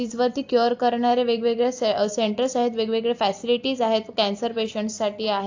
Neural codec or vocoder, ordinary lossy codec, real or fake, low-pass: none; AAC, 48 kbps; real; 7.2 kHz